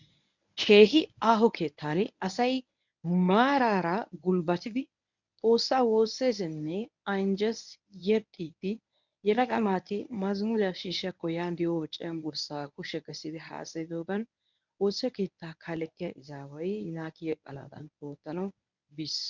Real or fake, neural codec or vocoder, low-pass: fake; codec, 24 kHz, 0.9 kbps, WavTokenizer, medium speech release version 1; 7.2 kHz